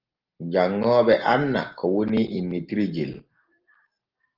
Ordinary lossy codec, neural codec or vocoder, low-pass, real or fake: Opus, 16 kbps; none; 5.4 kHz; real